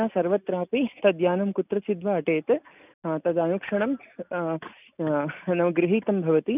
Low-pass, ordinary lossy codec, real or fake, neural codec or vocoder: 3.6 kHz; none; real; none